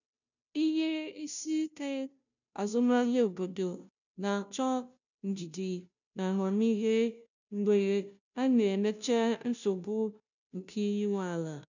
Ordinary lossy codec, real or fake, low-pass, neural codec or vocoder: none; fake; 7.2 kHz; codec, 16 kHz, 0.5 kbps, FunCodec, trained on Chinese and English, 25 frames a second